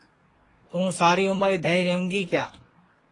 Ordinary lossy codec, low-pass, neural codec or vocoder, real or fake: AAC, 32 kbps; 10.8 kHz; codec, 24 kHz, 1 kbps, SNAC; fake